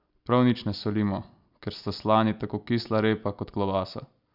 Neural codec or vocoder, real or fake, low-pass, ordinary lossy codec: none; real; 5.4 kHz; none